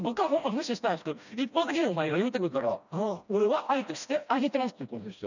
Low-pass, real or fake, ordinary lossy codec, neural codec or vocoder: 7.2 kHz; fake; none; codec, 16 kHz, 1 kbps, FreqCodec, smaller model